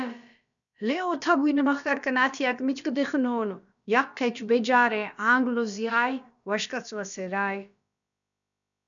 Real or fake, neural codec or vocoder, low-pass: fake; codec, 16 kHz, about 1 kbps, DyCAST, with the encoder's durations; 7.2 kHz